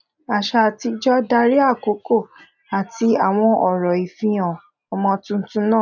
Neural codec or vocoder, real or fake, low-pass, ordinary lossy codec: none; real; 7.2 kHz; none